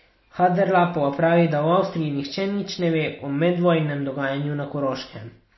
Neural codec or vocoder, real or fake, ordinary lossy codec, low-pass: none; real; MP3, 24 kbps; 7.2 kHz